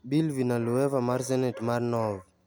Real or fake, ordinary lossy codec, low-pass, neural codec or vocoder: real; none; none; none